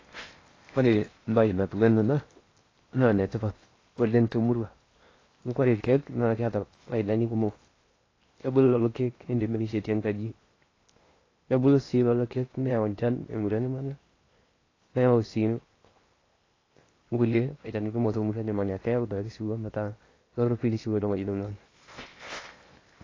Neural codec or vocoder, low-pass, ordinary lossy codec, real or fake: codec, 16 kHz in and 24 kHz out, 0.8 kbps, FocalCodec, streaming, 65536 codes; 7.2 kHz; AAC, 32 kbps; fake